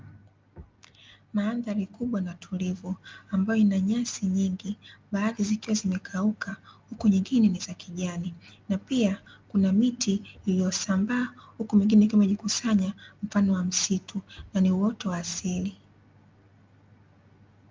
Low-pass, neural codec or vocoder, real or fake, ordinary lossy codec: 7.2 kHz; none; real; Opus, 24 kbps